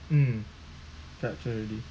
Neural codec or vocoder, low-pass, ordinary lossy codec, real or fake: none; none; none; real